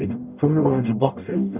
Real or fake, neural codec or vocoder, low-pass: fake; codec, 44.1 kHz, 0.9 kbps, DAC; 3.6 kHz